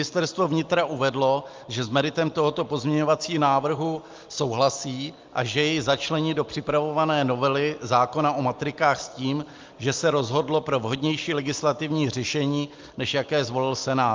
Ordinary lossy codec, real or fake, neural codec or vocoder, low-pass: Opus, 24 kbps; real; none; 7.2 kHz